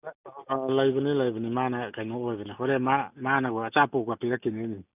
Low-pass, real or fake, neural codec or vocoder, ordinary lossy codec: 3.6 kHz; real; none; none